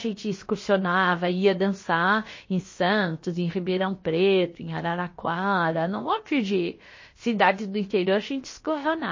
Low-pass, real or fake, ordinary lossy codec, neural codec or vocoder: 7.2 kHz; fake; MP3, 32 kbps; codec, 16 kHz, about 1 kbps, DyCAST, with the encoder's durations